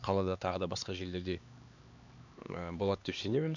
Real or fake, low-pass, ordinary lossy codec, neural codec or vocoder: fake; 7.2 kHz; none; codec, 16 kHz, 2 kbps, X-Codec, HuBERT features, trained on LibriSpeech